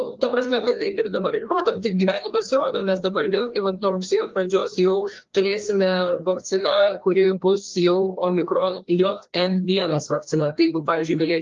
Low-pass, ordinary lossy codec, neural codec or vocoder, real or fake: 7.2 kHz; Opus, 32 kbps; codec, 16 kHz, 1 kbps, FreqCodec, larger model; fake